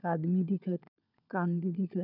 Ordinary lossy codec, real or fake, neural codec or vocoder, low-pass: none; fake; codec, 16 kHz, 16 kbps, FunCodec, trained on LibriTTS, 50 frames a second; 5.4 kHz